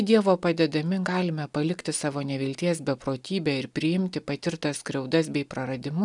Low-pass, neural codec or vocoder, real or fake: 10.8 kHz; none; real